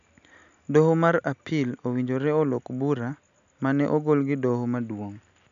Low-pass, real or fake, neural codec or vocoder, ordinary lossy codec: 7.2 kHz; real; none; none